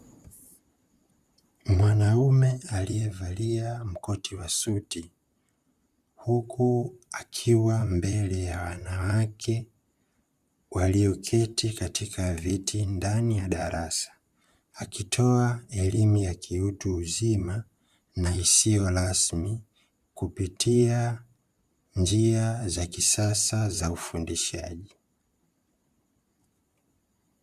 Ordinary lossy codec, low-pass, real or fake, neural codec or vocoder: Opus, 64 kbps; 14.4 kHz; fake; vocoder, 44.1 kHz, 128 mel bands, Pupu-Vocoder